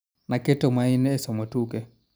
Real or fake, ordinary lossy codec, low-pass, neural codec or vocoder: real; none; none; none